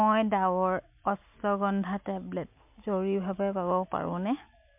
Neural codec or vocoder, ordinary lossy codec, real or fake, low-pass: none; MP3, 32 kbps; real; 3.6 kHz